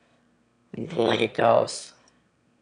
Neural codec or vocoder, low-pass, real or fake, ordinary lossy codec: autoencoder, 22.05 kHz, a latent of 192 numbers a frame, VITS, trained on one speaker; 9.9 kHz; fake; none